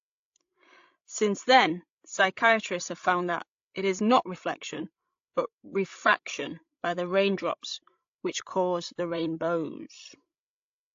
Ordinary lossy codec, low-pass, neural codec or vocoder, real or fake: AAC, 48 kbps; 7.2 kHz; codec, 16 kHz, 16 kbps, FreqCodec, larger model; fake